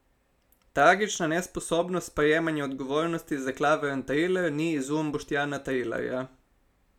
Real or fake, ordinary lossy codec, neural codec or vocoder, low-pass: real; none; none; 19.8 kHz